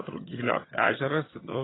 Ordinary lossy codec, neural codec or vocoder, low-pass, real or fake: AAC, 16 kbps; vocoder, 22.05 kHz, 80 mel bands, HiFi-GAN; 7.2 kHz; fake